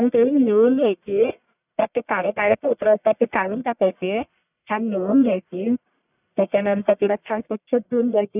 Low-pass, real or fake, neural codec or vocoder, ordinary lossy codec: 3.6 kHz; fake; codec, 44.1 kHz, 1.7 kbps, Pupu-Codec; none